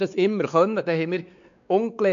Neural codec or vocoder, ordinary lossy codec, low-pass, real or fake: codec, 16 kHz, 4 kbps, X-Codec, WavLM features, trained on Multilingual LibriSpeech; none; 7.2 kHz; fake